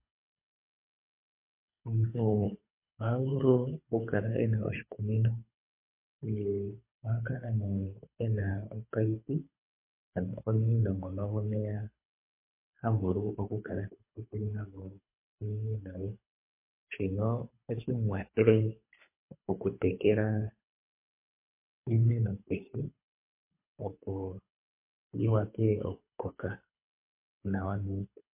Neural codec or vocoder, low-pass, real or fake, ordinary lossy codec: codec, 24 kHz, 3 kbps, HILCodec; 3.6 kHz; fake; MP3, 24 kbps